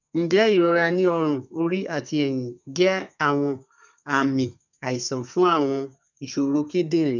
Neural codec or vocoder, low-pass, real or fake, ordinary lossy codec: codec, 32 kHz, 1.9 kbps, SNAC; 7.2 kHz; fake; none